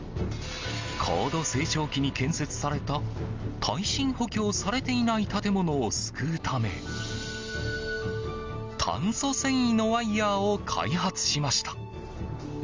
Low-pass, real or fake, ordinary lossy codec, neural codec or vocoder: 7.2 kHz; real; Opus, 32 kbps; none